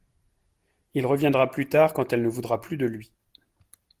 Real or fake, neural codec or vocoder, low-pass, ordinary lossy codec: real; none; 14.4 kHz; Opus, 24 kbps